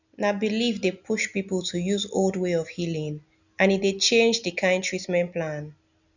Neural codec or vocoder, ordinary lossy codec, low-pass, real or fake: none; none; 7.2 kHz; real